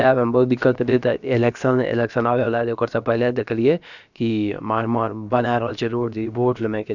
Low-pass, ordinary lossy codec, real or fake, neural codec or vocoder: 7.2 kHz; none; fake; codec, 16 kHz, about 1 kbps, DyCAST, with the encoder's durations